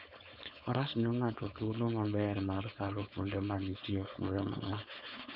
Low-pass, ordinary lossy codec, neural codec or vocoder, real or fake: 5.4 kHz; none; codec, 16 kHz, 4.8 kbps, FACodec; fake